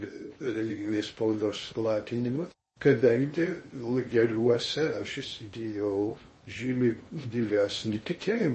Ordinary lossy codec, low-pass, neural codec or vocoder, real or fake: MP3, 32 kbps; 10.8 kHz; codec, 16 kHz in and 24 kHz out, 0.8 kbps, FocalCodec, streaming, 65536 codes; fake